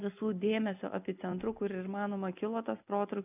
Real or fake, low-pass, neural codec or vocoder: real; 3.6 kHz; none